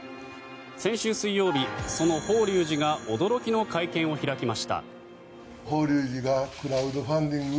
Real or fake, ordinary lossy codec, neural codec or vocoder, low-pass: real; none; none; none